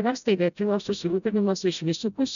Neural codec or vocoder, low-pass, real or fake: codec, 16 kHz, 0.5 kbps, FreqCodec, smaller model; 7.2 kHz; fake